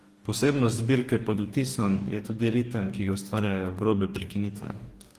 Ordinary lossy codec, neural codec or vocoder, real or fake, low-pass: Opus, 24 kbps; codec, 44.1 kHz, 2.6 kbps, DAC; fake; 14.4 kHz